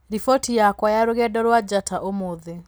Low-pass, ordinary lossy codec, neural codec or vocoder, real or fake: none; none; none; real